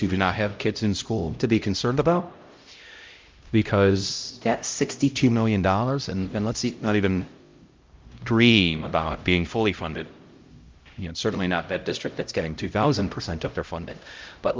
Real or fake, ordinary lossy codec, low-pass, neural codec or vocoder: fake; Opus, 24 kbps; 7.2 kHz; codec, 16 kHz, 0.5 kbps, X-Codec, HuBERT features, trained on LibriSpeech